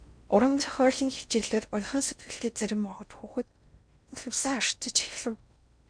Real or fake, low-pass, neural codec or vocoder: fake; 9.9 kHz; codec, 16 kHz in and 24 kHz out, 0.6 kbps, FocalCodec, streaming, 4096 codes